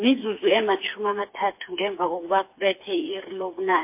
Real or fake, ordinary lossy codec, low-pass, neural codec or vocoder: fake; MP3, 24 kbps; 3.6 kHz; codec, 16 kHz, 4 kbps, FreqCodec, smaller model